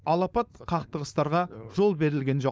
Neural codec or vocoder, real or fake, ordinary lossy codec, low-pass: codec, 16 kHz, 4.8 kbps, FACodec; fake; none; none